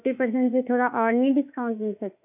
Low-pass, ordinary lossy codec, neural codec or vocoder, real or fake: 3.6 kHz; none; autoencoder, 48 kHz, 32 numbers a frame, DAC-VAE, trained on Japanese speech; fake